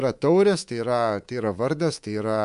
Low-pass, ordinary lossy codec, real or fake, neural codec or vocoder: 10.8 kHz; MP3, 64 kbps; fake; codec, 24 kHz, 3.1 kbps, DualCodec